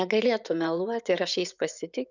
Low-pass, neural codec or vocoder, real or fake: 7.2 kHz; vocoder, 24 kHz, 100 mel bands, Vocos; fake